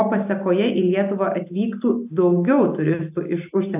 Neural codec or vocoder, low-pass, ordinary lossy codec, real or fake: none; 3.6 kHz; AAC, 32 kbps; real